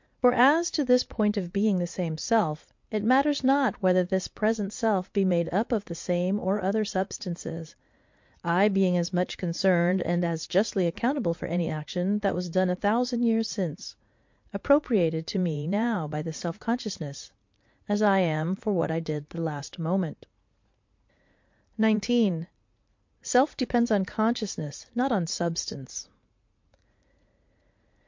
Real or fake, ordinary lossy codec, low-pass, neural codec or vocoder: fake; MP3, 48 kbps; 7.2 kHz; vocoder, 44.1 kHz, 80 mel bands, Vocos